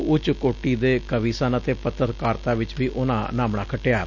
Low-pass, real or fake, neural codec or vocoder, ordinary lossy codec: 7.2 kHz; real; none; none